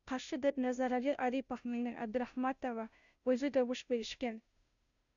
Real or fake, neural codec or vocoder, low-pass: fake; codec, 16 kHz, 0.5 kbps, FunCodec, trained on Chinese and English, 25 frames a second; 7.2 kHz